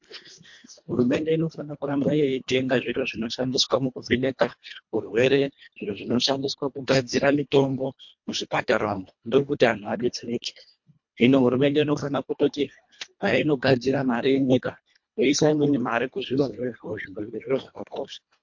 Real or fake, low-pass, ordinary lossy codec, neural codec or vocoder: fake; 7.2 kHz; MP3, 48 kbps; codec, 24 kHz, 1.5 kbps, HILCodec